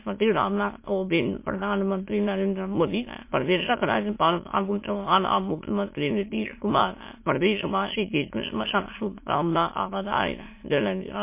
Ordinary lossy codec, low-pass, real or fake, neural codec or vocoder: MP3, 24 kbps; 3.6 kHz; fake; autoencoder, 22.05 kHz, a latent of 192 numbers a frame, VITS, trained on many speakers